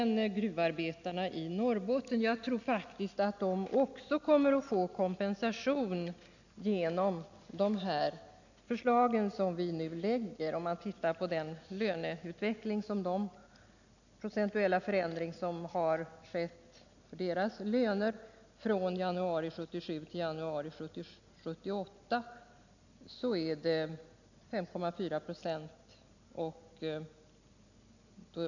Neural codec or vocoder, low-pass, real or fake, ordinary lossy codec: none; 7.2 kHz; real; none